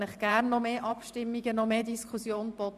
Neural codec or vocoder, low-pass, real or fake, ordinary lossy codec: vocoder, 44.1 kHz, 128 mel bands every 512 samples, BigVGAN v2; 14.4 kHz; fake; none